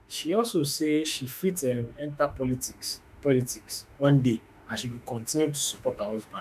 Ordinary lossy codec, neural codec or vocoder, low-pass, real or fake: none; autoencoder, 48 kHz, 32 numbers a frame, DAC-VAE, trained on Japanese speech; 14.4 kHz; fake